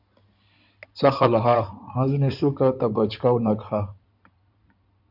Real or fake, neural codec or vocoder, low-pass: fake; codec, 16 kHz in and 24 kHz out, 2.2 kbps, FireRedTTS-2 codec; 5.4 kHz